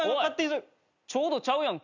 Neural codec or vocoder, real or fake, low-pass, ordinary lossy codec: none; real; 7.2 kHz; none